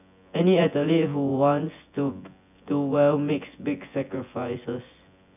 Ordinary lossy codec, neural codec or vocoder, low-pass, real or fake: none; vocoder, 24 kHz, 100 mel bands, Vocos; 3.6 kHz; fake